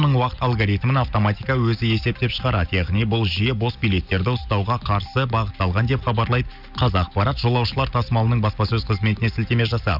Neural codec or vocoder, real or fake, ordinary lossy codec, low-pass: none; real; none; 5.4 kHz